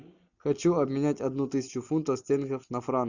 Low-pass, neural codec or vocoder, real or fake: 7.2 kHz; none; real